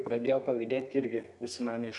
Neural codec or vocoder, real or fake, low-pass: codec, 32 kHz, 1.9 kbps, SNAC; fake; 10.8 kHz